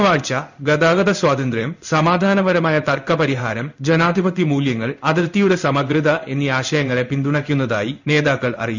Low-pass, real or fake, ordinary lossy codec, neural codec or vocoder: 7.2 kHz; fake; none; codec, 16 kHz in and 24 kHz out, 1 kbps, XY-Tokenizer